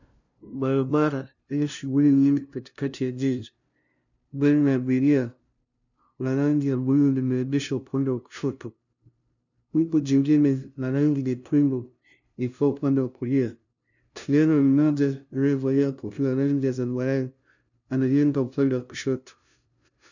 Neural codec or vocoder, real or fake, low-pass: codec, 16 kHz, 0.5 kbps, FunCodec, trained on LibriTTS, 25 frames a second; fake; 7.2 kHz